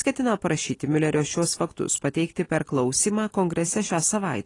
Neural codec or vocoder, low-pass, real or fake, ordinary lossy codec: none; 10.8 kHz; real; AAC, 32 kbps